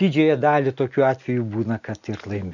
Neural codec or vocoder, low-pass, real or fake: none; 7.2 kHz; real